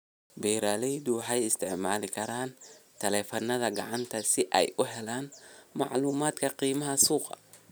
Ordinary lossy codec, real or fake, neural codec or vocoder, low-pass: none; real; none; none